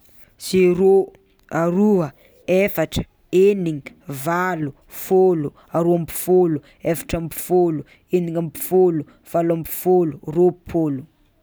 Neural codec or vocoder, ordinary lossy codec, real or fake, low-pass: none; none; real; none